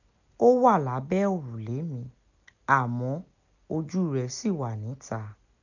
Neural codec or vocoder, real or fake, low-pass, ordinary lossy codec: none; real; 7.2 kHz; none